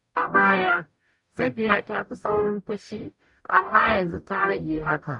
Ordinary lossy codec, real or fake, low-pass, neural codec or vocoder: none; fake; 10.8 kHz; codec, 44.1 kHz, 0.9 kbps, DAC